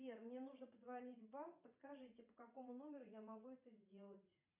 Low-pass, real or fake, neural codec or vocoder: 3.6 kHz; fake; vocoder, 44.1 kHz, 80 mel bands, Vocos